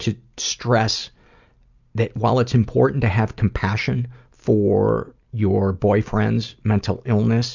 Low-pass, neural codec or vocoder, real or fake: 7.2 kHz; none; real